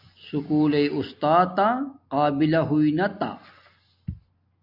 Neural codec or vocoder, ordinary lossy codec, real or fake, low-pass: none; AAC, 48 kbps; real; 5.4 kHz